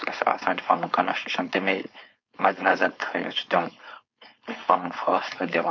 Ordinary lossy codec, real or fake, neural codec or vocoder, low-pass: MP3, 48 kbps; fake; codec, 16 kHz, 4.8 kbps, FACodec; 7.2 kHz